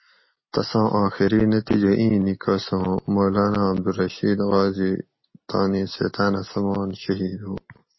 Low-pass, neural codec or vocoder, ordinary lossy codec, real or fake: 7.2 kHz; none; MP3, 24 kbps; real